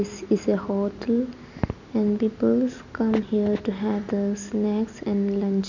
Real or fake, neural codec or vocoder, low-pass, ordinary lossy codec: real; none; 7.2 kHz; none